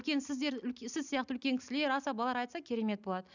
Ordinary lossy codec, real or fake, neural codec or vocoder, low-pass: none; real; none; 7.2 kHz